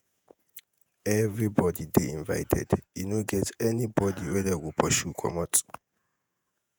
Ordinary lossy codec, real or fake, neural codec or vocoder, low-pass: none; fake; vocoder, 48 kHz, 128 mel bands, Vocos; none